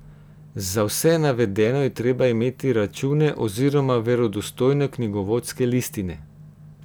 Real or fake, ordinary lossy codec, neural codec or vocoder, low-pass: real; none; none; none